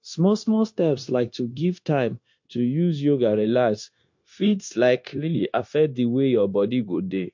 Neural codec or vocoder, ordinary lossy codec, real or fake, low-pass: codec, 16 kHz, 0.9 kbps, LongCat-Audio-Codec; MP3, 48 kbps; fake; 7.2 kHz